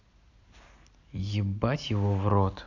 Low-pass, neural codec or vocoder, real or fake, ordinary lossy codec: 7.2 kHz; none; real; none